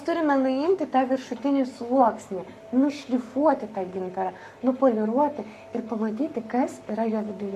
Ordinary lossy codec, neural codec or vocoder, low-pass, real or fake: MP3, 96 kbps; codec, 44.1 kHz, 7.8 kbps, Pupu-Codec; 14.4 kHz; fake